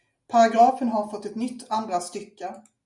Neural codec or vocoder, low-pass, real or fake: none; 10.8 kHz; real